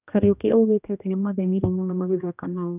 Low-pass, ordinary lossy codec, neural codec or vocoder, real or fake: 3.6 kHz; none; codec, 16 kHz, 1 kbps, X-Codec, HuBERT features, trained on general audio; fake